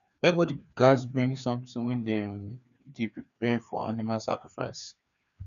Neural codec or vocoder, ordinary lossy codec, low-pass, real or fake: codec, 16 kHz, 2 kbps, FreqCodec, larger model; none; 7.2 kHz; fake